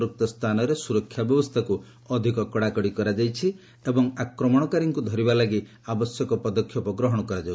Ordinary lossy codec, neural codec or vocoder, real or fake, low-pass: none; none; real; none